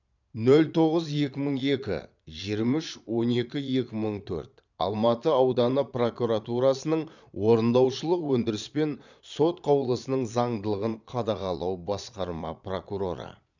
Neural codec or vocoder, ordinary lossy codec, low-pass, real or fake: vocoder, 22.05 kHz, 80 mel bands, Vocos; none; 7.2 kHz; fake